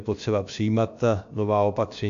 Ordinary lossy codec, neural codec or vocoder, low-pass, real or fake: MP3, 64 kbps; codec, 16 kHz, about 1 kbps, DyCAST, with the encoder's durations; 7.2 kHz; fake